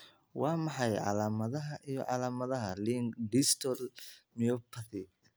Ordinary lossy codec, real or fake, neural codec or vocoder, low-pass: none; real; none; none